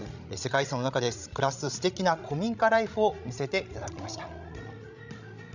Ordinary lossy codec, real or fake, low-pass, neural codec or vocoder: none; fake; 7.2 kHz; codec, 16 kHz, 16 kbps, FreqCodec, larger model